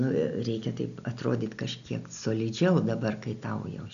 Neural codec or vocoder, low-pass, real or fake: none; 7.2 kHz; real